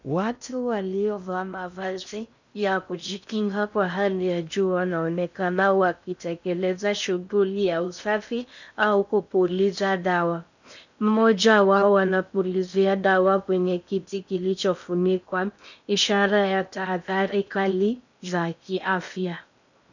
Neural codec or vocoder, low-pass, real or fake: codec, 16 kHz in and 24 kHz out, 0.6 kbps, FocalCodec, streaming, 2048 codes; 7.2 kHz; fake